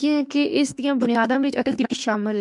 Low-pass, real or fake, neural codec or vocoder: 10.8 kHz; fake; autoencoder, 48 kHz, 32 numbers a frame, DAC-VAE, trained on Japanese speech